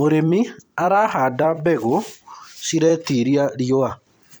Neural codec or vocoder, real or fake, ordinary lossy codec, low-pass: vocoder, 44.1 kHz, 128 mel bands every 256 samples, BigVGAN v2; fake; none; none